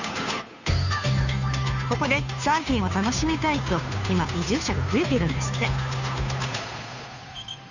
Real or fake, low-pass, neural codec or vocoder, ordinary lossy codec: fake; 7.2 kHz; codec, 16 kHz, 2 kbps, FunCodec, trained on Chinese and English, 25 frames a second; none